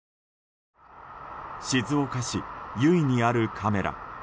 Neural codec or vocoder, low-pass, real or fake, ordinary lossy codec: none; none; real; none